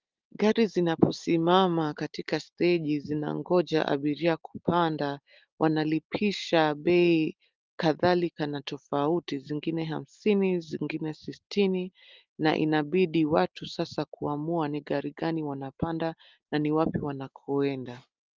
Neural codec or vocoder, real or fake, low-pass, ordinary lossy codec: none; real; 7.2 kHz; Opus, 32 kbps